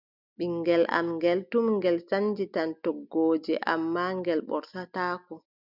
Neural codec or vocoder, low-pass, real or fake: none; 5.4 kHz; real